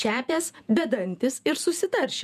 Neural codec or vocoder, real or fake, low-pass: vocoder, 48 kHz, 128 mel bands, Vocos; fake; 14.4 kHz